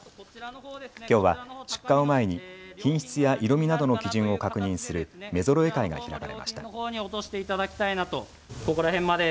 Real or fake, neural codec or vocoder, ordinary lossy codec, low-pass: real; none; none; none